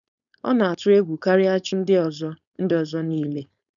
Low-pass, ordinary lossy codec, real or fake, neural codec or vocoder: 7.2 kHz; none; fake; codec, 16 kHz, 4.8 kbps, FACodec